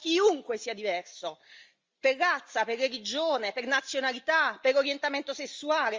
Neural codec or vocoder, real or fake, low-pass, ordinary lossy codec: none; real; 7.2 kHz; Opus, 32 kbps